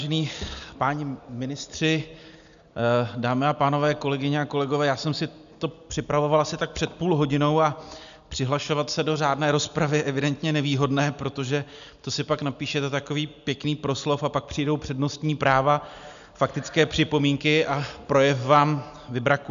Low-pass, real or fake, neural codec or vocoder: 7.2 kHz; real; none